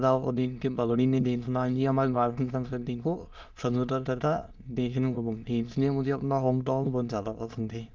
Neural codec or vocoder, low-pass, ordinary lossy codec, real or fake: autoencoder, 22.05 kHz, a latent of 192 numbers a frame, VITS, trained on many speakers; 7.2 kHz; Opus, 32 kbps; fake